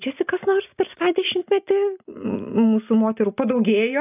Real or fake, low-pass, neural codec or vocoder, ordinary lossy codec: real; 3.6 kHz; none; AAC, 32 kbps